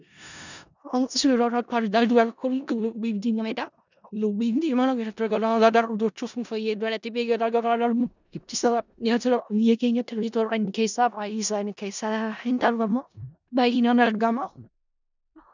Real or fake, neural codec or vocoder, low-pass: fake; codec, 16 kHz in and 24 kHz out, 0.4 kbps, LongCat-Audio-Codec, four codebook decoder; 7.2 kHz